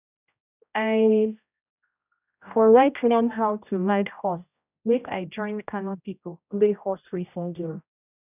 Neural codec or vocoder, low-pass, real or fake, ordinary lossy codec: codec, 16 kHz, 0.5 kbps, X-Codec, HuBERT features, trained on general audio; 3.6 kHz; fake; none